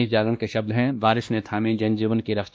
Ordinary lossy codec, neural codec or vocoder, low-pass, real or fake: none; codec, 16 kHz, 1 kbps, X-Codec, WavLM features, trained on Multilingual LibriSpeech; none; fake